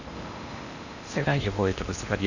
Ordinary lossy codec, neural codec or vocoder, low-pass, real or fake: none; codec, 16 kHz in and 24 kHz out, 0.8 kbps, FocalCodec, streaming, 65536 codes; 7.2 kHz; fake